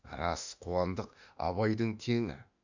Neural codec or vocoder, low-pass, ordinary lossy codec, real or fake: autoencoder, 48 kHz, 32 numbers a frame, DAC-VAE, trained on Japanese speech; 7.2 kHz; none; fake